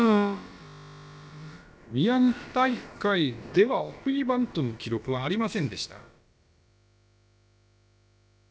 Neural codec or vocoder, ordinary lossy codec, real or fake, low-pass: codec, 16 kHz, about 1 kbps, DyCAST, with the encoder's durations; none; fake; none